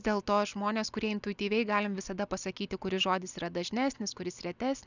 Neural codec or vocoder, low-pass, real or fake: none; 7.2 kHz; real